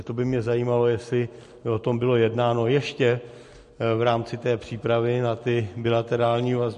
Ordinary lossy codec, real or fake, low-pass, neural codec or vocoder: MP3, 48 kbps; real; 14.4 kHz; none